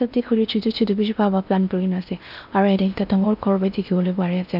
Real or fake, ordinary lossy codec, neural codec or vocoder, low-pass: fake; none; codec, 16 kHz in and 24 kHz out, 0.8 kbps, FocalCodec, streaming, 65536 codes; 5.4 kHz